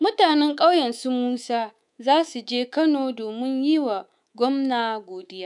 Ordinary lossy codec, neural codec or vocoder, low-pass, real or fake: none; codec, 24 kHz, 3.1 kbps, DualCodec; 10.8 kHz; fake